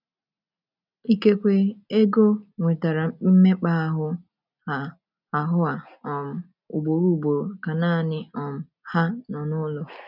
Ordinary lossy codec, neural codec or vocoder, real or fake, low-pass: none; none; real; 5.4 kHz